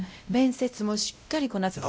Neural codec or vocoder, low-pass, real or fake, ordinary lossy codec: codec, 16 kHz, 0.5 kbps, X-Codec, WavLM features, trained on Multilingual LibriSpeech; none; fake; none